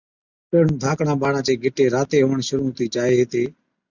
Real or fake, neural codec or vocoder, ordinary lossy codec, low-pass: real; none; Opus, 64 kbps; 7.2 kHz